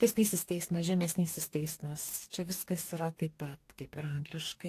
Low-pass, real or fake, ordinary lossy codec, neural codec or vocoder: 14.4 kHz; fake; AAC, 64 kbps; codec, 44.1 kHz, 2.6 kbps, DAC